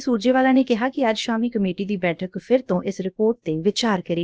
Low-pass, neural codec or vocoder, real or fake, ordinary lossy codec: none; codec, 16 kHz, about 1 kbps, DyCAST, with the encoder's durations; fake; none